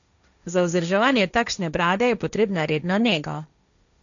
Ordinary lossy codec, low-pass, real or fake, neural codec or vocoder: none; 7.2 kHz; fake; codec, 16 kHz, 1.1 kbps, Voila-Tokenizer